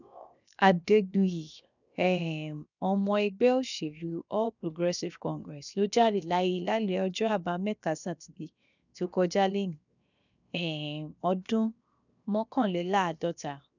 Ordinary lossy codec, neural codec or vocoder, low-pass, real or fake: none; codec, 16 kHz, 0.7 kbps, FocalCodec; 7.2 kHz; fake